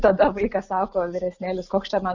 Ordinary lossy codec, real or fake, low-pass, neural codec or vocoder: Opus, 64 kbps; real; 7.2 kHz; none